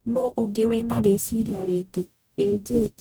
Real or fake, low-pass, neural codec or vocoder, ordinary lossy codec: fake; none; codec, 44.1 kHz, 0.9 kbps, DAC; none